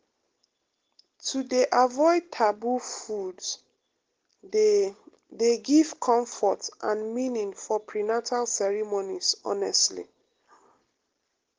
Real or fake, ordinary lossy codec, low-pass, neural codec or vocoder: real; Opus, 16 kbps; 7.2 kHz; none